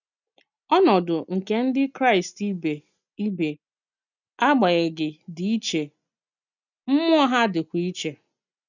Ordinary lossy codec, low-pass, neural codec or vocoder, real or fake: none; 7.2 kHz; none; real